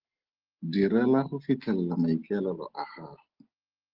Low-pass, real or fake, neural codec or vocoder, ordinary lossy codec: 5.4 kHz; real; none; Opus, 16 kbps